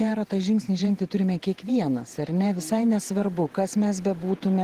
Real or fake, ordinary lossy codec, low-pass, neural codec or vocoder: fake; Opus, 16 kbps; 14.4 kHz; vocoder, 48 kHz, 128 mel bands, Vocos